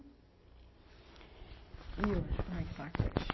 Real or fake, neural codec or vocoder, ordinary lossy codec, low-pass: real; none; MP3, 24 kbps; 7.2 kHz